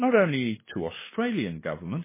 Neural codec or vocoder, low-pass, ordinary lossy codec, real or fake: codec, 16 kHz, 8 kbps, FunCodec, trained on LibriTTS, 25 frames a second; 3.6 kHz; MP3, 16 kbps; fake